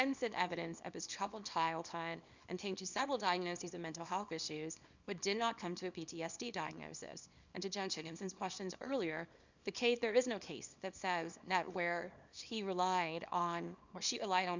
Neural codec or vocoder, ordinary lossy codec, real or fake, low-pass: codec, 24 kHz, 0.9 kbps, WavTokenizer, small release; Opus, 64 kbps; fake; 7.2 kHz